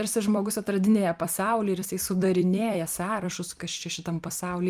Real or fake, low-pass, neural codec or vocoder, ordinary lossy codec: fake; 14.4 kHz; vocoder, 44.1 kHz, 128 mel bands every 256 samples, BigVGAN v2; Opus, 32 kbps